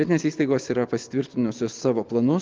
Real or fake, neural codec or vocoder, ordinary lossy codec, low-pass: real; none; Opus, 24 kbps; 7.2 kHz